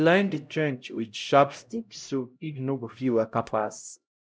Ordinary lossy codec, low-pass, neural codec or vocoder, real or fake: none; none; codec, 16 kHz, 0.5 kbps, X-Codec, HuBERT features, trained on LibriSpeech; fake